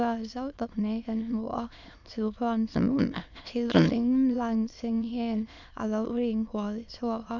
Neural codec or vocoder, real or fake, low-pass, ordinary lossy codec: autoencoder, 22.05 kHz, a latent of 192 numbers a frame, VITS, trained on many speakers; fake; 7.2 kHz; none